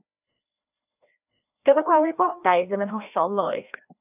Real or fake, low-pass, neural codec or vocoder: fake; 3.6 kHz; codec, 16 kHz, 1 kbps, FreqCodec, larger model